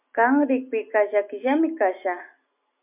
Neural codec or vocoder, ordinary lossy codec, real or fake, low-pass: none; MP3, 32 kbps; real; 3.6 kHz